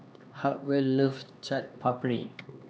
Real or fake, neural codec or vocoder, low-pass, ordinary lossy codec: fake; codec, 16 kHz, 2 kbps, X-Codec, HuBERT features, trained on LibriSpeech; none; none